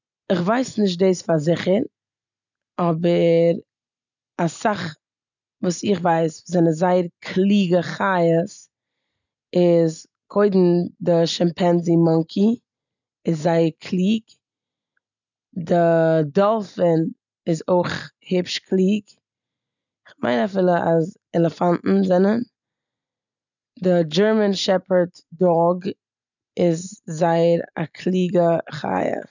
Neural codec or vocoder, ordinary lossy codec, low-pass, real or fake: none; none; 7.2 kHz; real